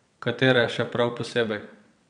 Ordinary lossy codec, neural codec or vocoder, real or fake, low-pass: none; vocoder, 22.05 kHz, 80 mel bands, WaveNeXt; fake; 9.9 kHz